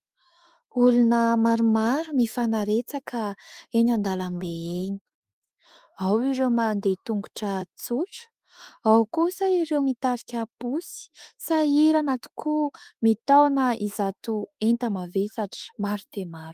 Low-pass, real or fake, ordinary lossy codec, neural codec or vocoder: 14.4 kHz; fake; Opus, 32 kbps; autoencoder, 48 kHz, 32 numbers a frame, DAC-VAE, trained on Japanese speech